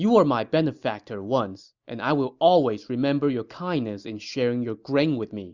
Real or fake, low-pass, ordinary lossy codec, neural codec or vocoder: real; 7.2 kHz; Opus, 64 kbps; none